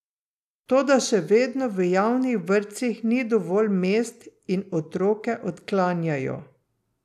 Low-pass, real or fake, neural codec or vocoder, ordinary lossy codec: 14.4 kHz; real; none; none